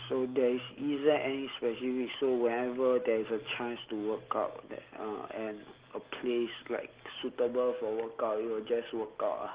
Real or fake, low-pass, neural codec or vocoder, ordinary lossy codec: real; 3.6 kHz; none; Opus, 16 kbps